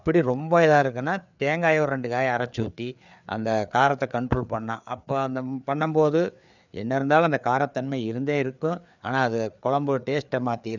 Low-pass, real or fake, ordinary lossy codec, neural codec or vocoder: 7.2 kHz; fake; none; codec, 16 kHz, 4 kbps, FreqCodec, larger model